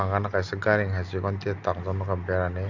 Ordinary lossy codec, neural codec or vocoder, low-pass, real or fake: none; none; 7.2 kHz; real